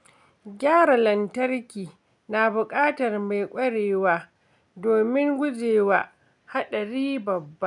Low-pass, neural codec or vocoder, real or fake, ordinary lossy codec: 10.8 kHz; none; real; none